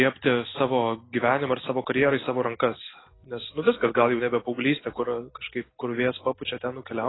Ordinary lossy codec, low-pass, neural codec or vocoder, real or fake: AAC, 16 kbps; 7.2 kHz; none; real